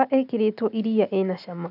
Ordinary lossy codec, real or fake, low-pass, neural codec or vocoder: none; real; 5.4 kHz; none